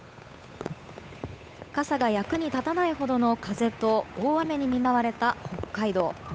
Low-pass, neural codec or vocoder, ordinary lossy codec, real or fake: none; codec, 16 kHz, 8 kbps, FunCodec, trained on Chinese and English, 25 frames a second; none; fake